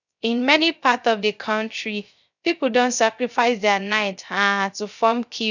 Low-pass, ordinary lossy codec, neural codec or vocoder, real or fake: 7.2 kHz; none; codec, 16 kHz, 0.3 kbps, FocalCodec; fake